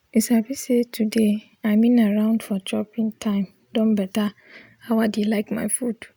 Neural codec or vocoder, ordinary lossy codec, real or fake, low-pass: none; none; real; none